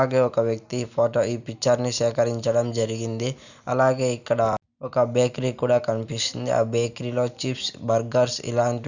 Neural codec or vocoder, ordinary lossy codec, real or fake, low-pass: none; none; real; 7.2 kHz